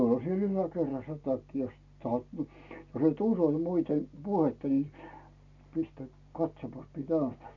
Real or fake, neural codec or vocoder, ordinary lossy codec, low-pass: real; none; none; 7.2 kHz